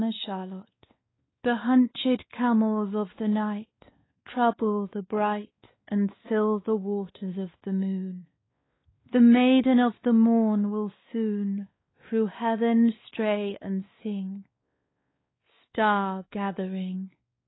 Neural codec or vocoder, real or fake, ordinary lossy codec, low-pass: codec, 16 kHz, 2 kbps, X-Codec, WavLM features, trained on Multilingual LibriSpeech; fake; AAC, 16 kbps; 7.2 kHz